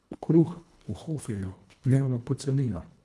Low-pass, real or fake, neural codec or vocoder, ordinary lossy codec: none; fake; codec, 24 kHz, 1.5 kbps, HILCodec; none